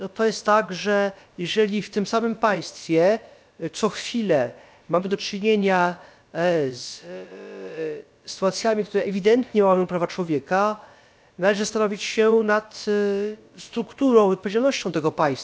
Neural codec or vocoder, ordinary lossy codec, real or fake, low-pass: codec, 16 kHz, about 1 kbps, DyCAST, with the encoder's durations; none; fake; none